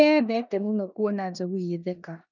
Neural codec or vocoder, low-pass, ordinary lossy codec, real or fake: codec, 16 kHz in and 24 kHz out, 0.9 kbps, LongCat-Audio-Codec, four codebook decoder; 7.2 kHz; none; fake